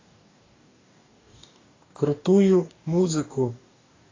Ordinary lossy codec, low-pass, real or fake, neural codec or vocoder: AAC, 32 kbps; 7.2 kHz; fake; codec, 44.1 kHz, 2.6 kbps, DAC